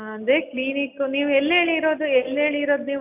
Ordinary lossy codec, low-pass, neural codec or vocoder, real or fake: none; 3.6 kHz; none; real